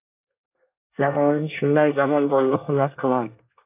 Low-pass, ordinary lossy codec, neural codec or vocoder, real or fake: 3.6 kHz; AAC, 32 kbps; codec, 24 kHz, 1 kbps, SNAC; fake